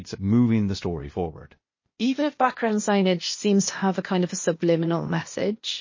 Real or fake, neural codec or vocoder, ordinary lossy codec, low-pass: fake; codec, 16 kHz, 0.8 kbps, ZipCodec; MP3, 32 kbps; 7.2 kHz